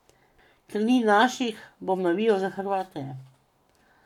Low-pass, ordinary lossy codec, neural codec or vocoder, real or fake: 19.8 kHz; none; codec, 44.1 kHz, 7.8 kbps, Pupu-Codec; fake